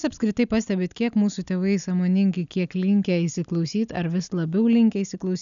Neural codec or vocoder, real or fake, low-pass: none; real; 7.2 kHz